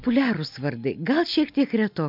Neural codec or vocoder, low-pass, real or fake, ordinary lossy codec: none; 5.4 kHz; real; MP3, 48 kbps